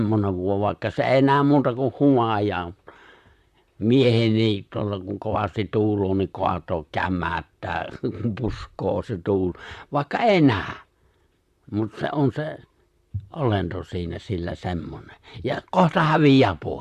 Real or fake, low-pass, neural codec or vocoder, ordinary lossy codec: fake; 14.4 kHz; vocoder, 44.1 kHz, 128 mel bands, Pupu-Vocoder; Opus, 64 kbps